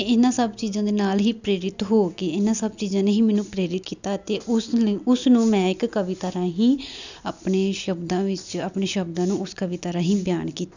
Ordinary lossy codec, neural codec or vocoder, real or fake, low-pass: none; none; real; 7.2 kHz